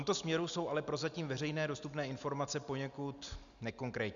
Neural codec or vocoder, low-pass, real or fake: none; 7.2 kHz; real